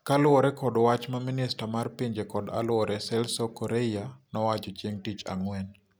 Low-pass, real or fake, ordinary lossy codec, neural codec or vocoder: none; real; none; none